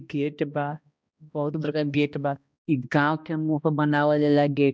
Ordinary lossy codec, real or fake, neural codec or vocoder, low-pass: none; fake; codec, 16 kHz, 1 kbps, X-Codec, HuBERT features, trained on balanced general audio; none